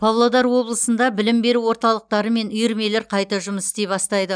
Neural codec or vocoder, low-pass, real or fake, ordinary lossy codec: none; 9.9 kHz; real; none